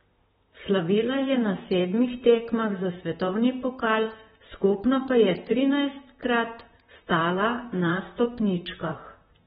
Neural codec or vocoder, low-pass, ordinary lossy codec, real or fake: vocoder, 44.1 kHz, 128 mel bands, Pupu-Vocoder; 19.8 kHz; AAC, 16 kbps; fake